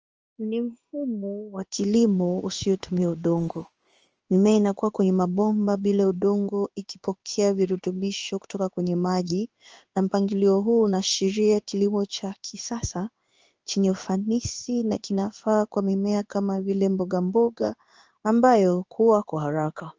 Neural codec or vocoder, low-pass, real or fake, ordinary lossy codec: codec, 16 kHz in and 24 kHz out, 1 kbps, XY-Tokenizer; 7.2 kHz; fake; Opus, 24 kbps